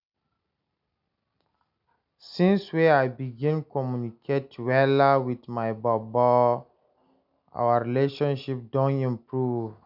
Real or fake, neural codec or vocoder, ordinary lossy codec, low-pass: real; none; none; 5.4 kHz